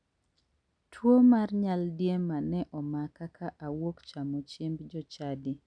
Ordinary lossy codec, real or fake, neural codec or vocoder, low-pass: none; real; none; 9.9 kHz